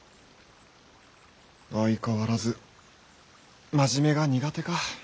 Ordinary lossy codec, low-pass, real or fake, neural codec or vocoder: none; none; real; none